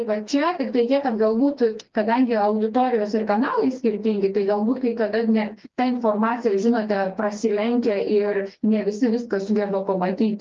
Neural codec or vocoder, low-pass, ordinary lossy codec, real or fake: codec, 16 kHz, 2 kbps, FreqCodec, smaller model; 7.2 kHz; Opus, 32 kbps; fake